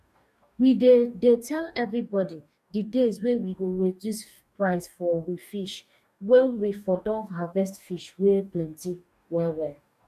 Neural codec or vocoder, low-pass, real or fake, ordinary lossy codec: codec, 44.1 kHz, 2.6 kbps, DAC; 14.4 kHz; fake; none